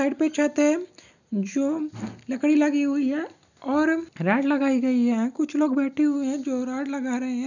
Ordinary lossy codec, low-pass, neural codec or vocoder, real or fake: none; 7.2 kHz; none; real